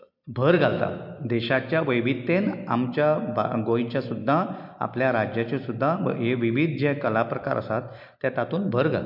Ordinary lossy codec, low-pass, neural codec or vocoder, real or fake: MP3, 48 kbps; 5.4 kHz; none; real